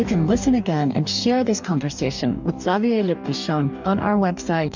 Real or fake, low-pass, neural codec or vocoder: fake; 7.2 kHz; codec, 44.1 kHz, 2.6 kbps, DAC